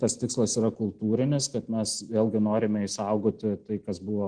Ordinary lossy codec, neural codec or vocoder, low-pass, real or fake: Opus, 16 kbps; none; 9.9 kHz; real